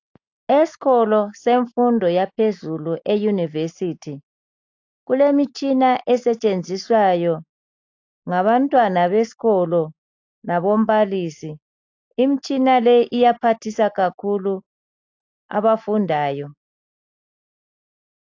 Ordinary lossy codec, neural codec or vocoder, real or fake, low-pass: AAC, 48 kbps; none; real; 7.2 kHz